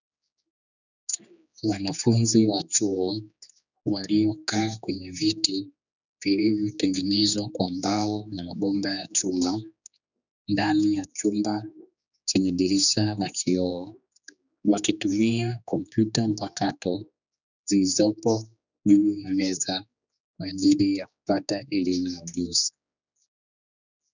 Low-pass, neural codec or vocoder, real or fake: 7.2 kHz; codec, 16 kHz, 2 kbps, X-Codec, HuBERT features, trained on general audio; fake